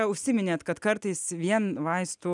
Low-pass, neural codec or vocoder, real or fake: 10.8 kHz; none; real